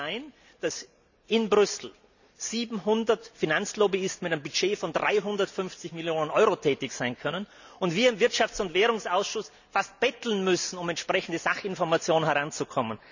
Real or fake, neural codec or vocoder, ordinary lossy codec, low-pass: real; none; none; 7.2 kHz